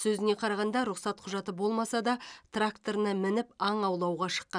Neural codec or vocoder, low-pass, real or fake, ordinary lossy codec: none; 9.9 kHz; real; none